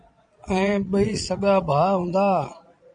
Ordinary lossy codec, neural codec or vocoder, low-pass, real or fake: MP3, 64 kbps; vocoder, 22.05 kHz, 80 mel bands, Vocos; 9.9 kHz; fake